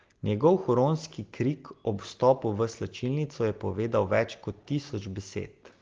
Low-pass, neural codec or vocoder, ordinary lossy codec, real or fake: 7.2 kHz; none; Opus, 16 kbps; real